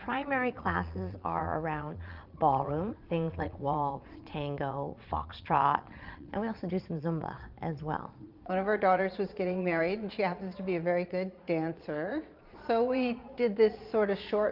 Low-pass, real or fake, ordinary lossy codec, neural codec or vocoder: 5.4 kHz; fake; Opus, 24 kbps; vocoder, 22.05 kHz, 80 mel bands, Vocos